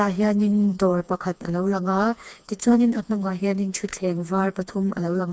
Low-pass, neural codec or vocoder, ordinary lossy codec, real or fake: none; codec, 16 kHz, 2 kbps, FreqCodec, smaller model; none; fake